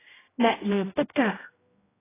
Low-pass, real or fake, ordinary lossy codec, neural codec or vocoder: 3.6 kHz; fake; AAC, 16 kbps; codec, 16 kHz, 0.5 kbps, X-Codec, HuBERT features, trained on general audio